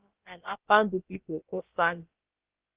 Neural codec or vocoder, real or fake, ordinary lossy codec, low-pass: codec, 16 kHz, about 1 kbps, DyCAST, with the encoder's durations; fake; Opus, 32 kbps; 3.6 kHz